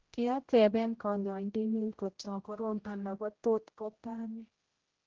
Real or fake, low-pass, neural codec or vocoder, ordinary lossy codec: fake; 7.2 kHz; codec, 16 kHz, 0.5 kbps, X-Codec, HuBERT features, trained on general audio; Opus, 16 kbps